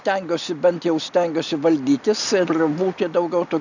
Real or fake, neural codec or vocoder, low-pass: real; none; 7.2 kHz